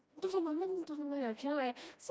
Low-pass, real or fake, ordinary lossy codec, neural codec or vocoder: none; fake; none; codec, 16 kHz, 1 kbps, FreqCodec, smaller model